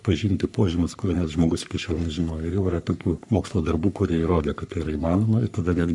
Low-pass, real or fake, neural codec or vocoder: 10.8 kHz; fake; codec, 44.1 kHz, 3.4 kbps, Pupu-Codec